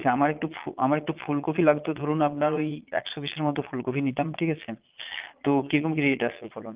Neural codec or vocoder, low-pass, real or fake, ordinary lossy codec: vocoder, 22.05 kHz, 80 mel bands, Vocos; 3.6 kHz; fake; Opus, 32 kbps